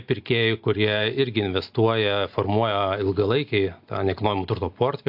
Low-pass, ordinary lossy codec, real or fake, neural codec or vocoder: 5.4 kHz; AAC, 48 kbps; real; none